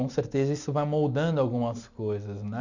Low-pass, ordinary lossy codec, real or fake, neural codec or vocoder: 7.2 kHz; none; real; none